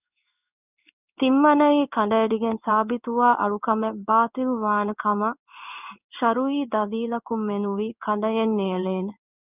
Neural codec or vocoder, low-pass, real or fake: codec, 16 kHz in and 24 kHz out, 1 kbps, XY-Tokenizer; 3.6 kHz; fake